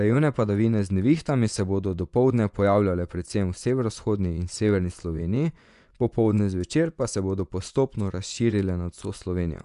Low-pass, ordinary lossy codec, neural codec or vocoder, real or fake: 10.8 kHz; none; vocoder, 24 kHz, 100 mel bands, Vocos; fake